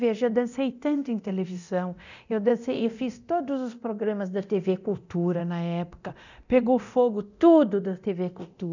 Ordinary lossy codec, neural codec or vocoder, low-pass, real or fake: none; codec, 24 kHz, 0.9 kbps, DualCodec; 7.2 kHz; fake